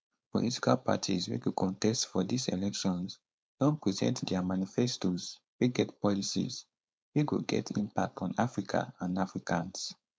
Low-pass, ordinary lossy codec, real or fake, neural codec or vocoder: none; none; fake; codec, 16 kHz, 4.8 kbps, FACodec